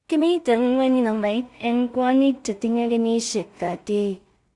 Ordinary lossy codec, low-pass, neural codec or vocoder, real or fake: Opus, 64 kbps; 10.8 kHz; codec, 16 kHz in and 24 kHz out, 0.4 kbps, LongCat-Audio-Codec, two codebook decoder; fake